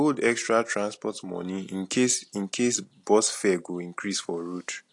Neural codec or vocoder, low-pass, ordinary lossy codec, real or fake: none; 10.8 kHz; AAC, 48 kbps; real